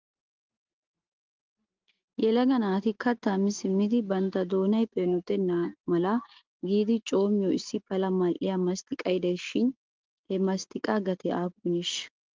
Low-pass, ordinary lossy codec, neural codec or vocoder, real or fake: 7.2 kHz; Opus, 32 kbps; none; real